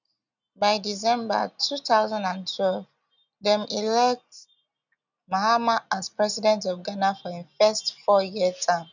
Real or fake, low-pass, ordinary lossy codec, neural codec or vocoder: real; 7.2 kHz; none; none